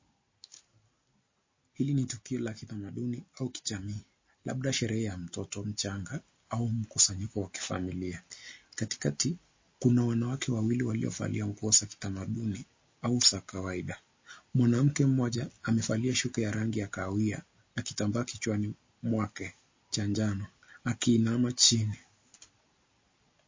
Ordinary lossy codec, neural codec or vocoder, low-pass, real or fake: MP3, 32 kbps; none; 7.2 kHz; real